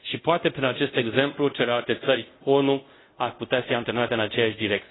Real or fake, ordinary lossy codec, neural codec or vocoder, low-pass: fake; AAC, 16 kbps; codec, 24 kHz, 0.5 kbps, DualCodec; 7.2 kHz